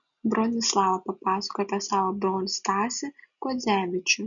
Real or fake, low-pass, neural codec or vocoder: real; 7.2 kHz; none